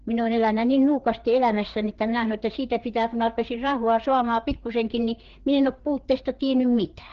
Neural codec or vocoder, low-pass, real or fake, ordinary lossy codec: codec, 16 kHz, 4 kbps, FreqCodec, larger model; 7.2 kHz; fake; Opus, 16 kbps